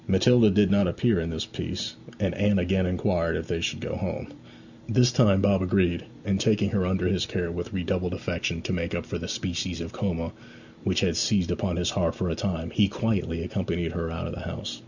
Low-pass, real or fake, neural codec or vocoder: 7.2 kHz; real; none